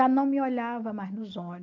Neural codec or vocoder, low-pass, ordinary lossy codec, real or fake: none; 7.2 kHz; none; real